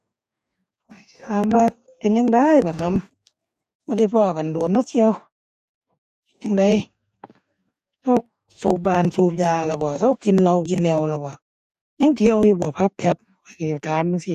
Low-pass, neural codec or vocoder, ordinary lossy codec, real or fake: 14.4 kHz; codec, 44.1 kHz, 2.6 kbps, DAC; none; fake